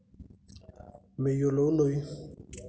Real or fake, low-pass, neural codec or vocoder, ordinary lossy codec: real; none; none; none